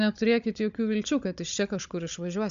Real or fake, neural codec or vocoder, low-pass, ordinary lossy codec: fake; codec, 16 kHz, 16 kbps, FunCodec, trained on Chinese and English, 50 frames a second; 7.2 kHz; AAC, 64 kbps